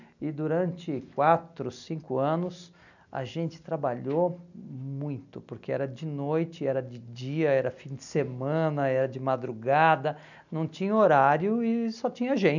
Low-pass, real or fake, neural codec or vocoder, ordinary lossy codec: 7.2 kHz; real; none; none